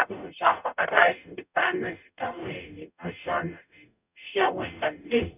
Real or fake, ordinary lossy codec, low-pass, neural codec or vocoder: fake; none; 3.6 kHz; codec, 44.1 kHz, 0.9 kbps, DAC